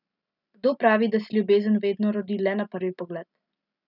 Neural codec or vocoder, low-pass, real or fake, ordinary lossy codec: none; 5.4 kHz; real; none